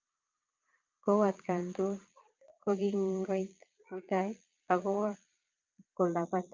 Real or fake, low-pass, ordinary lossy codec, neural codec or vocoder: fake; 7.2 kHz; Opus, 24 kbps; vocoder, 22.05 kHz, 80 mel bands, Vocos